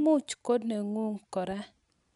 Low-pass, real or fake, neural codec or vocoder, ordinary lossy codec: 10.8 kHz; real; none; none